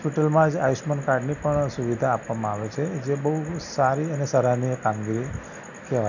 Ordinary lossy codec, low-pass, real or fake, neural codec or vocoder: none; 7.2 kHz; real; none